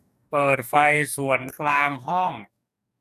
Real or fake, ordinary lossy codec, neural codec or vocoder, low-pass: fake; none; codec, 44.1 kHz, 2.6 kbps, DAC; 14.4 kHz